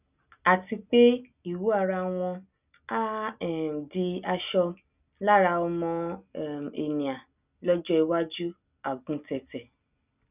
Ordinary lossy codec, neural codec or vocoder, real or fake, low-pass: none; none; real; 3.6 kHz